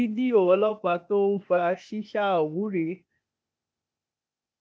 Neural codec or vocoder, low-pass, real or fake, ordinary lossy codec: codec, 16 kHz, 0.8 kbps, ZipCodec; none; fake; none